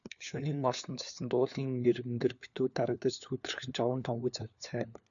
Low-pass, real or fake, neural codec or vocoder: 7.2 kHz; fake; codec, 16 kHz, 4 kbps, FunCodec, trained on LibriTTS, 50 frames a second